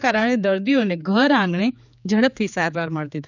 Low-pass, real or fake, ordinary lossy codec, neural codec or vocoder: 7.2 kHz; fake; none; codec, 16 kHz, 4 kbps, X-Codec, HuBERT features, trained on general audio